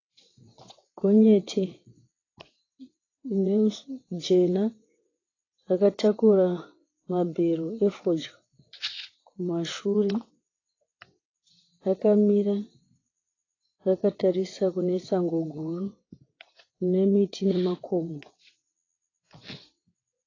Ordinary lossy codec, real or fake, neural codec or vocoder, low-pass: AAC, 32 kbps; fake; vocoder, 22.05 kHz, 80 mel bands, WaveNeXt; 7.2 kHz